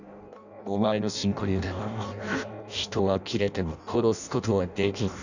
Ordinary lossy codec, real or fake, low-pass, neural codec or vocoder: none; fake; 7.2 kHz; codec, 16 kHz in and 24 kHz out, 0.6 kbps, FireRedTTS-2 codec